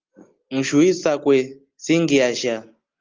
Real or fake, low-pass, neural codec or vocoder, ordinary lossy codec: real; 7.2 kHz; none; Opus, 32 kbps